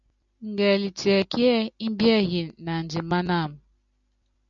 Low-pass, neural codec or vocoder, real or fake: 7.2 kHz; none; real